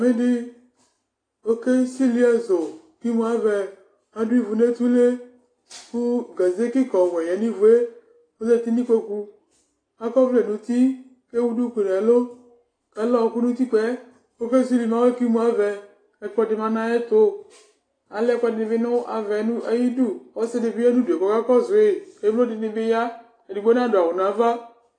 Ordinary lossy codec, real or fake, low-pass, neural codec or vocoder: AAC, 32 kbps; real; 9.9 kHz; none